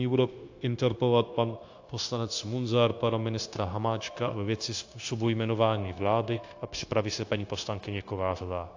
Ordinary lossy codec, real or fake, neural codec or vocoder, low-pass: AAC, 48 kbps; fake; codec, 16 kHz, 0.9 kbps, LongCat-Audio-Codec; 7.2 kHz